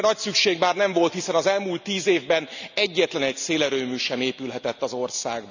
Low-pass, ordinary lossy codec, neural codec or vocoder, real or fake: 7.2 kHz; none; none; real